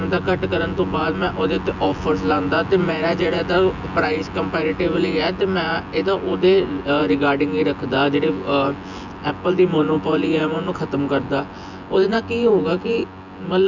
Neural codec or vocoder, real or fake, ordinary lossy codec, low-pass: vocoder, 24 kHz, 100 mel bands, Vocos; fake; none; 7.2 kHz